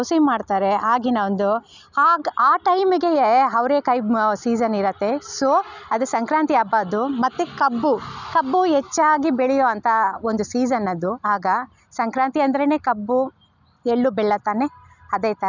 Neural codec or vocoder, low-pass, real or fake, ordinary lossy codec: none; 7.2 kHz; real; none